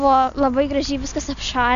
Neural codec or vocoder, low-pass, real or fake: none; 7.2 kHz; real